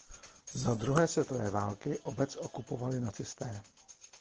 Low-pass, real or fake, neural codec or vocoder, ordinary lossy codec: 7.2 kHz; real; none; Opus, 16 kbps